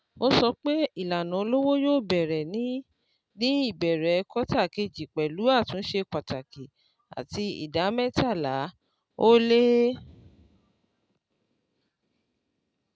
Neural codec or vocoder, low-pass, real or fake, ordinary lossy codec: none; none; real; none